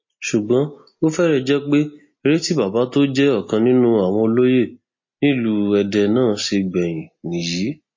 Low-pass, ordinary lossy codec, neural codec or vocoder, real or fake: 7.2 kHz; MP3, 32 kbps; none; real